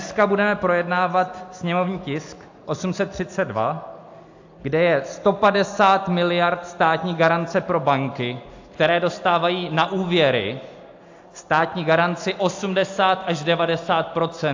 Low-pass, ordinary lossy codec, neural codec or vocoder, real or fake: 7.2 kHz; AAC, 48 kbps; none; real